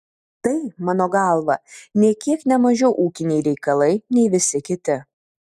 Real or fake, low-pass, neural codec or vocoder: real; 14.4 kHz; none